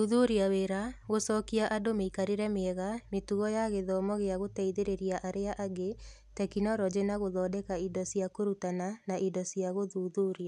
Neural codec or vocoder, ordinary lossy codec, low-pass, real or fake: none; none; none; real